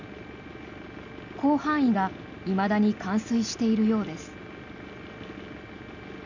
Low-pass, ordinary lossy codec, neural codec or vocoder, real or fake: 7.2 kHz; none; none; real